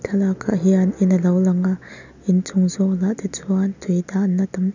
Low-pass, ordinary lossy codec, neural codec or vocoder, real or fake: 7.2 kHz; none; none; real